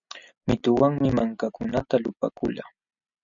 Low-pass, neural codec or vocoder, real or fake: 7.2 kHz; none; real